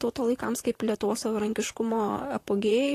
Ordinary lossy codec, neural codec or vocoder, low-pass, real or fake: AAC, 48 kbps; vocoder, 44.1 kHz, 128 mel bands, Pupu-Vocoder; 14.4 kHz; fake